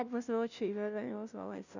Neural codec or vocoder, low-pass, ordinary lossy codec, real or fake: codec, 16 kHz, 0.5 kbps, FunCodec, trained on Chinese and English, 25 frames a second; 7.2 kHz; none; fake